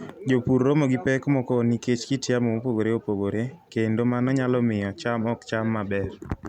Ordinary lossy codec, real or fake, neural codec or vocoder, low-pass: none; real; none; 19.8 kHz